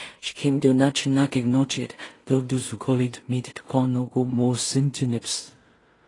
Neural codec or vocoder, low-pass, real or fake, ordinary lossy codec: codec, 16 kHz in and 24 kHz out, 0.4 kbps, LongCat-Audio-Codec, two codebook decoder; 10.8 kHz; fake; AAC, 32 kbps